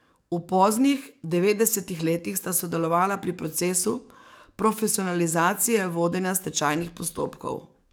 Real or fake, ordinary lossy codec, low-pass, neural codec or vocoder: fake; none; none; codec, 44.1 kHz, 7.8 kbps, DAC